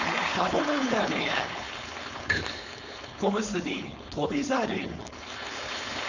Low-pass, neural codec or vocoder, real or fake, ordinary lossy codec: 7.2 kHz; codec, 16 kHz, 4.8 kbps, FACodec; fake; none